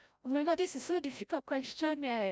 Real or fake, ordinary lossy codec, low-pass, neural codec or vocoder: fake; none; none; codec, 16 kHz, 0.5 kbps, FreqCodec, larger model